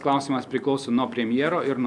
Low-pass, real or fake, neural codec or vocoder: 10.8 kHz; real; none